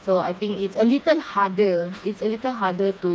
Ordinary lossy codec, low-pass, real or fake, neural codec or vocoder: none; none; fake; codec, 16 kHz, 2 kbps, FreqCodec, smaller model